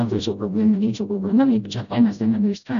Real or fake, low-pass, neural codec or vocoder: fake; 7.2 kHz; codec, 16 kHz, 0.5 kbps, FreqCodec, smaller model